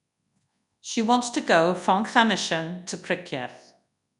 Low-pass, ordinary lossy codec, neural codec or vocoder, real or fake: 10.8 kHz; none; codec, 24 kHz, 0.9 kbps, WavTokenizer, large speech release; fake